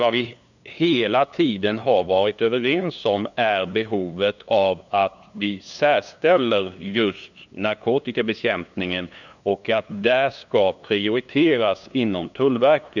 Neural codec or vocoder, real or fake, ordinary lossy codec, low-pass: codec, 16 kHz, 2 kbps, FunCodec, trained on LibriTTS, 25 frames a second; fake; none; 7.2 kHz